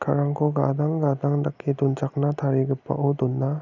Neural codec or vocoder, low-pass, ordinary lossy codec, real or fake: none; 7.2 kHz; none; real